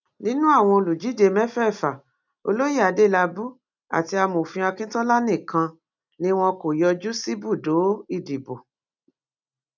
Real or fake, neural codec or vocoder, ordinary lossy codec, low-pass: real; none; none; 7.2 kHz